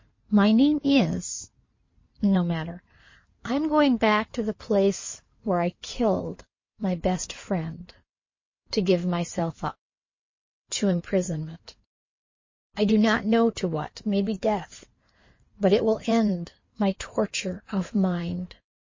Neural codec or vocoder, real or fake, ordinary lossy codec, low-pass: codec, 16 kHz in and 24 kHz out, 2.2 kbps, FireRedTTS-2 codec; fake; MP3, 32 kbps; 7.2 kHz